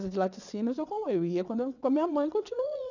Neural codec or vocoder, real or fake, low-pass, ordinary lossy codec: vocoder, 22.05 kHz, 80 mel bands, WaveNeXt; fake; 7.2 kHz; none